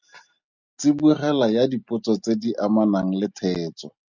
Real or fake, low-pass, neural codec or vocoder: real; 7.2 kHz; none